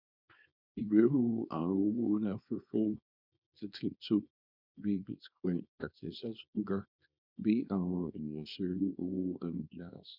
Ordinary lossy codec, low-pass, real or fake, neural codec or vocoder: none; 5.4 kHz; fake; codec, 24 kHz, 0.9 kbps, WavTokenizer, small release